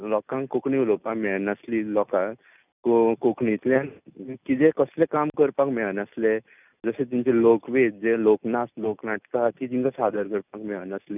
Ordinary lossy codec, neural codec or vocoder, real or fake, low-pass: none; none; real; 3.6 kHz